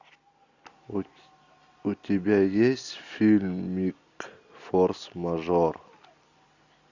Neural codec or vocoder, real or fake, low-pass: none; real; 7.2 kHz